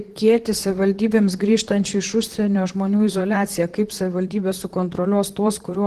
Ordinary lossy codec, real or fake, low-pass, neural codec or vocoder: Opus, 16 kbps; fake; 14.4 kHz; vocoder, 44.1 kHz, 128 mel bands, Pupu-Vocoder